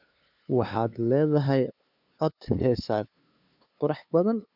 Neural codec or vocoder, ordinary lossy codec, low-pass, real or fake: codec, 16 kHz, 2 kbps, FunCodec, trained on LibriTTS, 25 frames a second; none; 5.4 kHz; fake